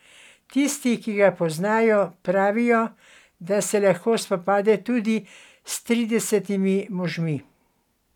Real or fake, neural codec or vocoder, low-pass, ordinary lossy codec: real; none; 19.8 kHz; none